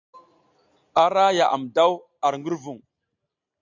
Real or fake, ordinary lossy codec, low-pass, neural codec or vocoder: real; AAC, 48 kbps; 7.2 kHz; none